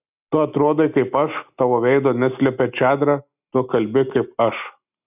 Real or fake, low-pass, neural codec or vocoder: real; 3.6 kHz; none